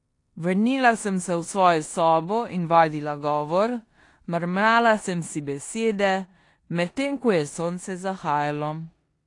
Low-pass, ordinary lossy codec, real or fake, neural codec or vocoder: 10.8 kHz; AAC, 48 kbps; fake; codec, 16 kHz in and 24 kHz out, 0.9 kbps, LongCat-Audio-Codec, four codebook decoder